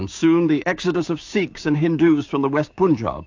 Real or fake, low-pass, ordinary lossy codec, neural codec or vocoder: fake; 7.2 kHz; AAC, 48 kbps; codec, 16 kHz, 6 kbps, DAC